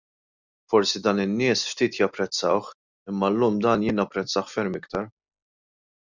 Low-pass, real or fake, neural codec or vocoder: 7.2 kHz; real; none